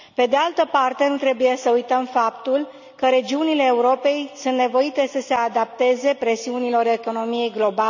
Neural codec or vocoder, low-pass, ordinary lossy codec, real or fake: none; 7.2 kHz; none; real